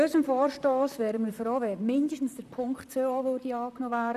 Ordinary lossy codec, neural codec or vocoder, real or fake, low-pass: none; vocoder, 44.1 kHz, 128 mel bands, Pupu-Vocoder; fake; 14.4 kHz